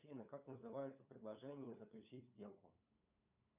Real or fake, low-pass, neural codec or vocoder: fake; 3.6 kHz; codec, 16 kHz, 8 kbps, FunCodec, trained on LibriTTS, 25 frames a second